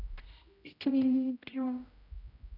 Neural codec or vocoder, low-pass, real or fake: codec, 16 kHz, 0.5 kbps, X-Codec, HuBERT features, trained on general audio; 5.4 kHz; fake